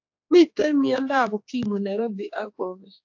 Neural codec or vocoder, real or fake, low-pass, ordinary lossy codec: codec, 16 kHz, 2 kbps, X-Codec, HuBERT features, trained on general audio; fake; 7.2 kHz; AAC, 48 kbps